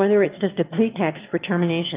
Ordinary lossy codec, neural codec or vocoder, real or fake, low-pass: Opus, 24 kbps; autoencoder, 22.05 kHz, a latent of 192 numbers a frame, VITS, trained on one speaker; fake; 3.6 kHz